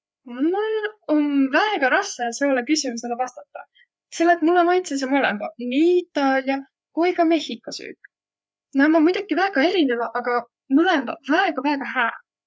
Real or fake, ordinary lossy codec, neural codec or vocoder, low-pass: fake; none; codec, 16 kHz, 4 kbps, FreqCodec, larger model; none